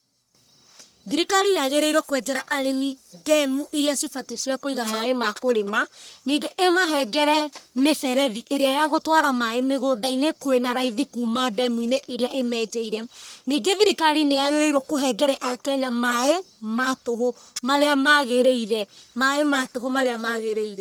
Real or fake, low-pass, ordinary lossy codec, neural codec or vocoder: fake; none; none; codec, 44.1 kHz, 1.7 kbps, Pupu-Codec